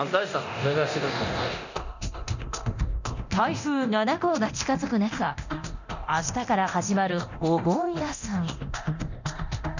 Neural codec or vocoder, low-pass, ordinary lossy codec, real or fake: codec, 24 kHz, 0.9 kbps, DualCodec; 7.2 kHz; none; fake